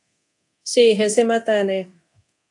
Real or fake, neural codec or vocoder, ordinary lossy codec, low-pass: fake; codec, 24 kHz, 0.9 kbps, DualCodec; AAC, 48 kbps; 10.8 kHz